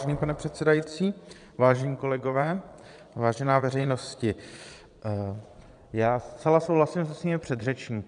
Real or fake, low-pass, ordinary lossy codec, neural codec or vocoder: fake; 9.9 kHz; AAC, 96 kbps; vocoder, 22.05 kHz, 80 mel bands, Vocos